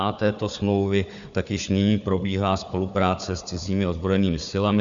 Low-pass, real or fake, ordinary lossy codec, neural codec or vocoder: 7.2 kHz; fake; Opus, 64 kbps; codec, 16 kHz, 4 kbps, FunCodec, trained on Chinese and English, 50 frames a second